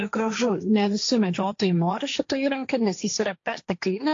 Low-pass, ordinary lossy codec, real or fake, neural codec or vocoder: 7.2 kHz; AAC, 48 kbps; fake; codec, 16 kHz, 1.1 kbps, Voila-Tokenizer